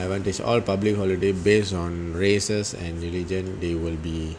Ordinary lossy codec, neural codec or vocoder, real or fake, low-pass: MP3, 96 kbps; none; real; 9.9 kHz